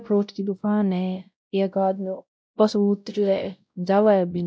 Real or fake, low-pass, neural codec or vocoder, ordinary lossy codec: fake; none; codec, 16 kHz, 0.5 kbps, X-Codec, WavLM features, trained on Multilingual LibriSpeech; none